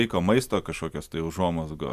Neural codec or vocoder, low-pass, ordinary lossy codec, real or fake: none; 14.4 kHz; Opus, 64 kbps; real